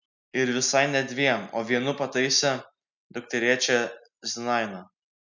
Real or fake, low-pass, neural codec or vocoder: real; 7.2 kHz; none